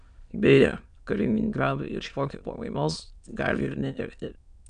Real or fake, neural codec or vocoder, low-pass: fake; autoencoder, 22.05 kHz, a latent of 192 numbers a frame, VITS, trained on many speakers; 9.9 kHz